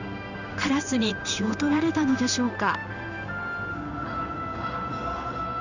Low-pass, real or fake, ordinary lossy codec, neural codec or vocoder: 7.2 kHz; fake; none; codec, 16 kHz in and 24 kHz out, 1 kbps, XY-Tokenizer